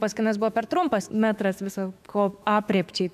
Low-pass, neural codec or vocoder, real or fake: 14.4 kHz; autoencoder, 48 kHz, 128 numbers a frame, DAC-VAE, trained on Japanese speech; fake